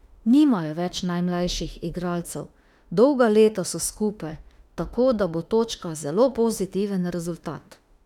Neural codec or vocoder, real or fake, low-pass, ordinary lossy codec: autoencoder, 48 kHz, 32 numbers a frame, DAC-VAE, trained on Japanese speech; fake; 19.8 kHz; none